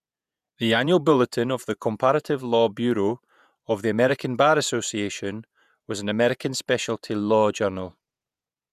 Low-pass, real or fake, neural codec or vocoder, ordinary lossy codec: 14.4 kHz; fake; vocoder, 48 kHz, 128 mel bands, Vocos; none